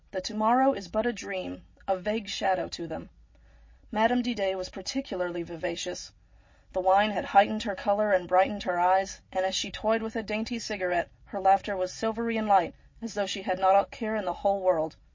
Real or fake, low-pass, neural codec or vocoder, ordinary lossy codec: real; 7.2 kHz; none; MP3, 32 kbps